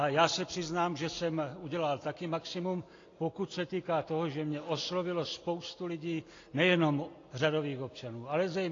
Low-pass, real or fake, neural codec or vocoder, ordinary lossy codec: 7.2 kHz; real; none; AAC, 32 kbps